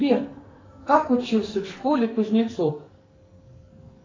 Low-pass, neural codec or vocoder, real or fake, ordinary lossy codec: 7.2 kHz; codec, 44.1 kHz, 2.6 kbps, SNAC; fake; AAC, 32 kbps